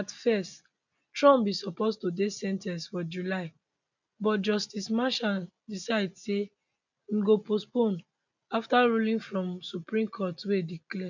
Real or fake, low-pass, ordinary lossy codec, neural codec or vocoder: real; 7.2 kHz; none; none